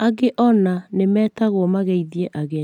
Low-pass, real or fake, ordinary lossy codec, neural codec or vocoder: 19.8 kHz; real; none; none